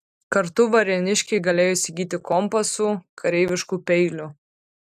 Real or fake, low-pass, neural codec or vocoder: real; 14.4 kHz; none